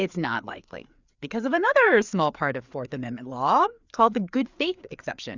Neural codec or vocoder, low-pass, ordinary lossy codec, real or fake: codec, 16 kHz, 4 kbps, FreqCodec, larger model; 7.2 kHz; Opus, 64 kbps; fake